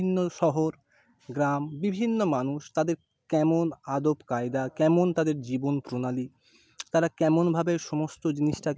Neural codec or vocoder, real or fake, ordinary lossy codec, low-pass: none; real; none; none